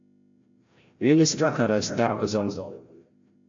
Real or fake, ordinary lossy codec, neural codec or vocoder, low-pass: fake; AAC, 48 kbps; codec, 16 kHz, 0.5 kbps, FreqCodec, larger model; 7.2 kHz